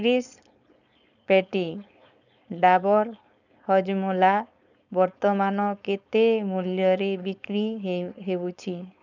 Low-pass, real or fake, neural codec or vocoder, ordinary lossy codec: 7.2 kHz; fake; codec, 16 kHz, 4.8 kbps, FACodec; none